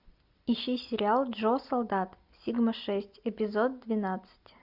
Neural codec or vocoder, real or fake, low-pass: none; real; 5.4 kHz